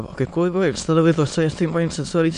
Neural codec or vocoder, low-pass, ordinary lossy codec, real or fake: autoencoder, 22.05 kHz, a latent of 192 numbers a frame, VITS, trained on many speakers; 9.9 kHz; MP3, 64 kbps; fake